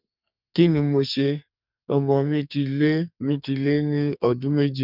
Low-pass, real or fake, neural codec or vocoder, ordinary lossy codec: 5.4 kHz; fake; codec, 44.1 kHz, 2.6 kbps, SNAC; none